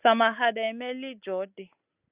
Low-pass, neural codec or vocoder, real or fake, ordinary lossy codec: 3.6 kHz; none; real; Opus, 64 kbps